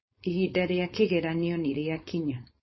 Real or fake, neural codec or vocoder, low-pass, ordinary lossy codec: fake; codec, 16 kHz, 4.8 kbps, FACodec; 7.2 kHz; MP3, 24 kbps